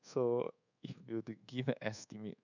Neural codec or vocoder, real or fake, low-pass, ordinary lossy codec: codec, 24 kHz, 1.2 kbps, DualCodec; fake; 7.2 kHz; none